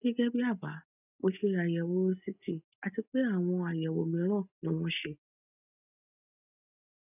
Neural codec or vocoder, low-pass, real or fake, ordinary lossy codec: none; 3.6 kHz; real; none